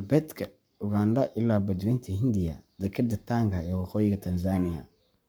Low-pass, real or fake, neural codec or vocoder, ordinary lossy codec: none; fake; codec, 44.1 kHz, 7.8 kbps, Pupu-Codec; none